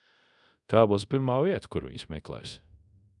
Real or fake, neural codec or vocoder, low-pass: fake; codec, 24 kHz, 0.5 kbps, DualCodec; 10.8 kHz